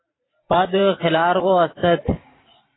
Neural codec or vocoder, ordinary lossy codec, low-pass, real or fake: vocoder, 44.1 kHz, 128 mel bands every 512 samples, BigVGAN v2; AAC, 16 kbps; 7.2 kHz; fake